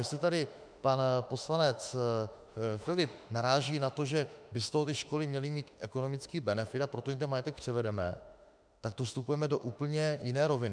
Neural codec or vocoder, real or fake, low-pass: autoencoder, 48 kHz, 32 numbers a frame, DAC-VAE, trained on Japanese speech; fake; 9.9 kHz